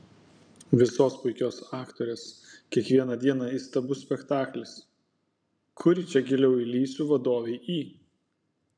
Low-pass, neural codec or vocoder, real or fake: 9.9 kHz; vocoder, 44.1 kHz, 128 mel bands every 512 samples, BigVGAN v2; fake